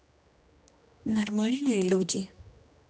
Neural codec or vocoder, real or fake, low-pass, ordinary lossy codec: codec, 16 kHz, 1 kbps, X-Codec, HuBERT features, trained on general audio; fake; none; none